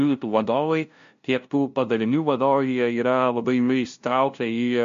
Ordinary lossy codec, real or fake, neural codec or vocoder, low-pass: MP3, 48 kbps; fake; codec, 16 kHz, 0.5 kbps, FunCodec, trained on LibriTTS, 25 frames a second; 7.2 kHz